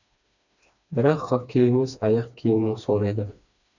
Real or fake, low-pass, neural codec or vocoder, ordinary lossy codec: fake; 7.2 kHz; codec, 16 kHz, 2 kbps, FreqCodec, smaller model; none